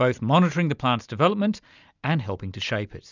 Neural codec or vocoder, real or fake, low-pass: none; real; 7.2 kHz